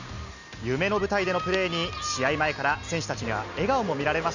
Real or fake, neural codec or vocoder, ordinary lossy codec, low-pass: real; none; none; 7.2 kHz